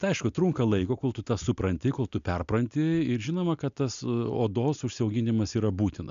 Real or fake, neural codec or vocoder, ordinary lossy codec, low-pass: real; none; MP3, 64 kbps; 7.2 kHz